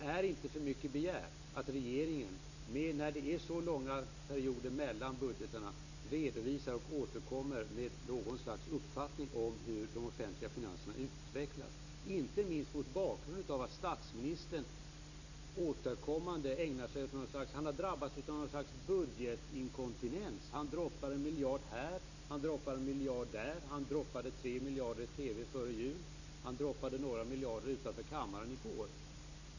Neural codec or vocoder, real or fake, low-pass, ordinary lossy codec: none; real; 7.2 kHz; none